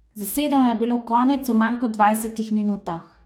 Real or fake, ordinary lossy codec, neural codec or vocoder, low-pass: fake; none; codec, 44.1 kHz, 2.6 kbps, DAC; 19.8 kHz